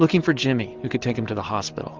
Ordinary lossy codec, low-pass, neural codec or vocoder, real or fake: Opus, 16 kbps; 7.2 kHz; none; real